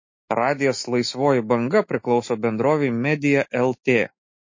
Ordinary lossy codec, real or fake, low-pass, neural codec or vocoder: MP3, 32 kbps; fake; 7.2 kHz; autoencoder, 48 kHz, 128 numbers a frame, DAC-VAE, trained on Japanese speech